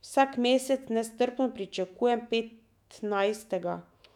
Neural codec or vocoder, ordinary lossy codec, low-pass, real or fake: autoencoder, 48 kHz, 128 numbers a frame, DAC-VAE, trained on Japanese speech; none; 19.8 kHz; fake